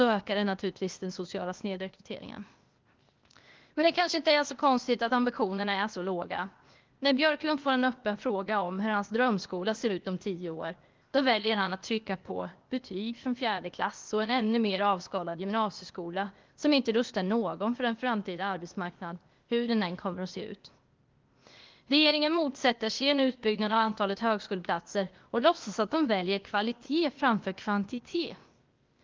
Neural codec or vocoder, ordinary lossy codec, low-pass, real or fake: codec, 16 kHz, 0.8 kbps, ZipCodec; Opus, 32 kbps; 7.2 kHz; fake